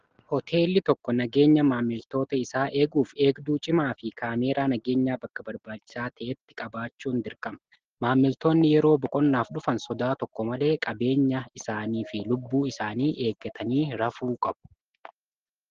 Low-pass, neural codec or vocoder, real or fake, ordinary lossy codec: 7.2 kHz; none; real; Opus, 16 kbps